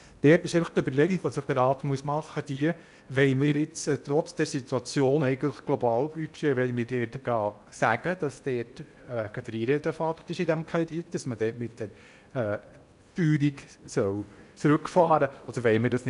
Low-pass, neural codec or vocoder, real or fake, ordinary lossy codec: 10.8 kHz; codec, 16 kHz in and 24 kHz out, 0.8 kbps, FocalCodec, streaming, 65536 codes; fake; none